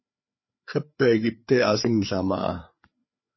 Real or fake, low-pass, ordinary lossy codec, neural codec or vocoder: fake; 7.2 kHz; MP3, 24 kbps; codec, 16 kHz, 4 kbps, FreqCodec, larger model